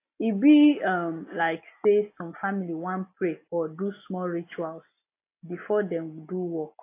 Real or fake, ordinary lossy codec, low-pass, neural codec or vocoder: real; AAC, 24 kbps; 3.6 kHz; none